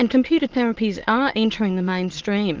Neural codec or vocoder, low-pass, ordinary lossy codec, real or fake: autoencoder, 22.05 kHz, a latent of 192 numbers a frame, VITS, trained on many speakers; 7.2 kHz; Opus, 16 kbps; fake